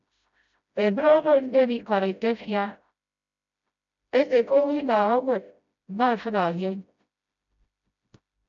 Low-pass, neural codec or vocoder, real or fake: 7.2 kHz; codec, 16 kHz, 0.5 kbps, FreqCodec, smaller model; fake